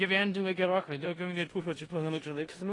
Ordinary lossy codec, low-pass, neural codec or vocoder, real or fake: AAC, 32 kbps; 10.8 kHz; codec, 16 kHz in and 24 kHz out, 0.4 kbps, LongCat-Audio-Codec, four codebook decoder; fake